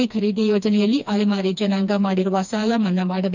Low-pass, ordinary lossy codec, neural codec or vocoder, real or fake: 7.2 kHz; none; codec, 16 kHz, 2 kbps, FreqCodec, smaller model; fake